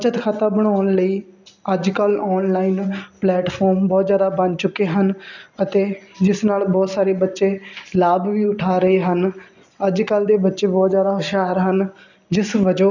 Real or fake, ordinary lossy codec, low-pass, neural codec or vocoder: real; none; 7.2 kHz; none